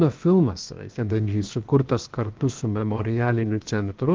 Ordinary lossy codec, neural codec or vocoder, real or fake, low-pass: Opus, 32 kbps; codec, 16 kHz, 0.7 kbps, FocalCodec; fake; 7.2 kHz